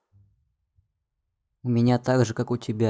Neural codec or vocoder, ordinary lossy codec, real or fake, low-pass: none; none; real; none